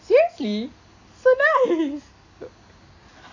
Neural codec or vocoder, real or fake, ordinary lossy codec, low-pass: none; real; AAC, 48 kbps; 7.2 kHz